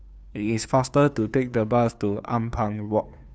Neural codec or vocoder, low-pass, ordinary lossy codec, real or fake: codec, 16 kHz, 2 kbps, FunCodec, trained on Chinese and English, 25 frames a second; none; none; fake